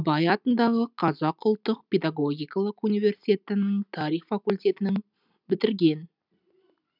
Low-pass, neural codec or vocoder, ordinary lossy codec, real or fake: 5.4 kHz; none; none; real